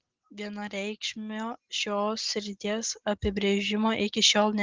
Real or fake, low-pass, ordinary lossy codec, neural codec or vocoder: real; 7.2 kHz; Opus, 16 kbps; none